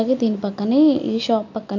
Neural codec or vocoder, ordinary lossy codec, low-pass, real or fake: none; none; 7.2 kHz; real